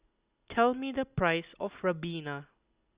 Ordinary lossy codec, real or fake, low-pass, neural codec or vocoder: Opus, 64 kbps; real; 3.6 kHz; none